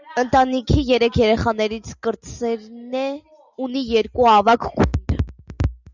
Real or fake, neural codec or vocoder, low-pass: real; none; 7.2 kHz